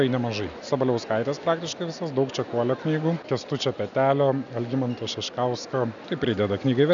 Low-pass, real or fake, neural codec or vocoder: 7.2 kHz; real; none